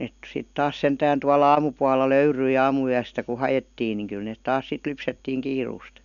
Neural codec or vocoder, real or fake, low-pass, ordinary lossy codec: none; real; 7.2 kHz; none